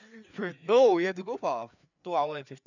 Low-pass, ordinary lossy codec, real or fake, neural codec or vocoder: 7.2 kHz; none; fake; codec, 16 kHz, 4 kbps, FreqCodec, larger model